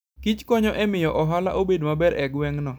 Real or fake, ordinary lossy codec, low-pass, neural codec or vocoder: real; none; none; none